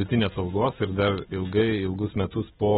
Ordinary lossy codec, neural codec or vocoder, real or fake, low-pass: AAC, 16 kbps; none; real; 19.8 kHz